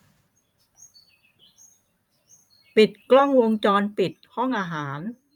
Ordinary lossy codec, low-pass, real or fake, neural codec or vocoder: none; 19.8 kHz; fake; vocoder, 44.1 kHz, 128 mel bands every 512 samples, BigVGAN v2